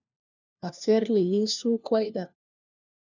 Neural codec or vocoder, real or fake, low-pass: codec, 16 kHz, 1 kbps, FunCodec, trained on LibriTTS, 50 frames a second; fake; 7.2 kHz